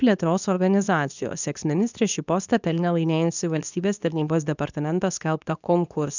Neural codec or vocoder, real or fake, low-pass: codec, 24 kHz, 0.9 kbps, WavTokenizer, medium speech release version 2; fake; 7.2 kHz